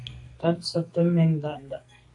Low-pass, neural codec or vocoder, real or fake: 10.8 kHz; codec, 44.1 kHz, 2.6 kbps, SNAC; fake